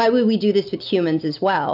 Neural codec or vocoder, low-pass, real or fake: none; 5.4 kHz; real